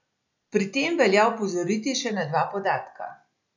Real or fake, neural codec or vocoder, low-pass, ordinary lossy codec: real; none; 7.2 kHz; none